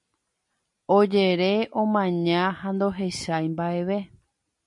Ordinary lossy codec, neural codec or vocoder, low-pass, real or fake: MP3, 48 kbps; none; 10.8 kHz; real